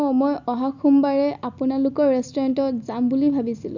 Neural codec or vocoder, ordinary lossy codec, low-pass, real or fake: none; none; 7.2 kHz; real